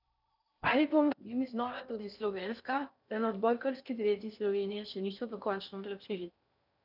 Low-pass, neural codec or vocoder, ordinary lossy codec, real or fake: 5.4 kHz; codec, 16 kHz in and 24 kHz out, 0.6 kbps, FocalCodec, streaming, 4096 codes; none; fake